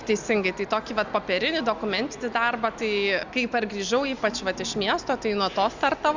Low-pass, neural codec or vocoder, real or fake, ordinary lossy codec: 7.2 kHz; none; real; Opus, 64 kbps